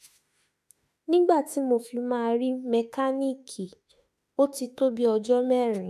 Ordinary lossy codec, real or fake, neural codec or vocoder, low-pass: none; fake; autoencoder, 48 kHz, 32 numbers a frame, DAC-VAE, trained on Japanese speech; 14.4 kHz